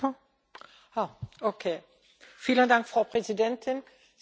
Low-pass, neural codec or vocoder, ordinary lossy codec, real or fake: none; none; none; real